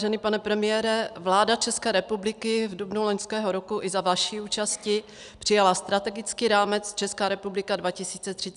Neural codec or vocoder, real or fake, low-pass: none; real; 10.8 kHz